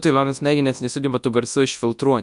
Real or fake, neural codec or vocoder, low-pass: fake; codec, 24 kHz, 0.9 kbps, WavTokenizer, large speech release; 10.8 kHz